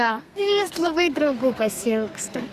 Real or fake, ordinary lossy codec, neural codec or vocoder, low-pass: fake; Opus, 64 kbps; codec, 32 kHz, 1.9 kbps, SNAC; 14.4 kHz